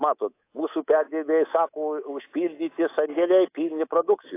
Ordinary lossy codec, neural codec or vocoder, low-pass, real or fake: AAC, 24 kbps; codec, 24 kHz, 3.1 kbps, DualCodec; 3.6 kHz; fake